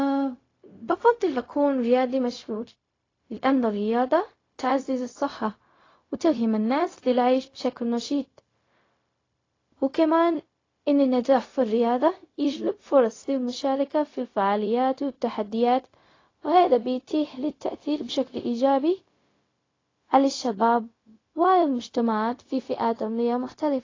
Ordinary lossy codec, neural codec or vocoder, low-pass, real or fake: AAC, 32 kbps; codec, 16 kHz, 0.4 kbps, LongCat-Audio-Codec; 7.2 kHz; fake